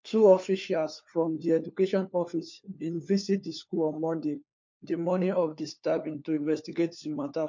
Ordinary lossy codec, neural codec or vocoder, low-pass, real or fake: MP3, 48 kbps; codec, 16 kHz, 4 kbps, FunCodec, trained on LibriTTS, 50 frames a second; 7.2 kHz; fake